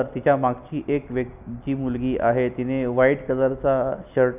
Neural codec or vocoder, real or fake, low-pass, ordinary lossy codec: none; real; 3.6 kHz; none